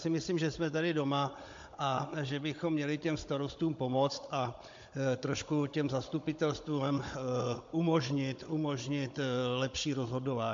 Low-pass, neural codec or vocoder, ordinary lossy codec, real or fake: 7.2 kHz; codec, 16 kHz, 16 kbps, FunCodec, trained on Chinese and English, 50 frames a second; MP3, 48 kbps; fake